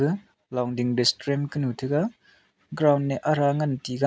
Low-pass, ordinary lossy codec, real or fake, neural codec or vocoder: none; none; real; none